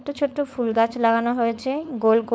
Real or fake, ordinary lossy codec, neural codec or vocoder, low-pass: fake; none; codec, 16 kHz, 4.8 kbps, FACodec; none